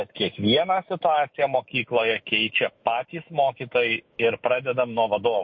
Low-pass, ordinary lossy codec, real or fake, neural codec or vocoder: 7.2 kHz; MP3, 32 kbps; fake; autoencoder, 48 kHz, 128 numbers a frame, DAC-VAE, trained on Japanese speech